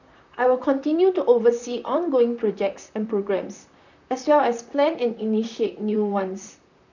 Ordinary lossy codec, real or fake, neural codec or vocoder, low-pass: none; fake; vocoder, 44.1 kHz, 128 mel bands, Pupu-Vocoder; 7.2 kHz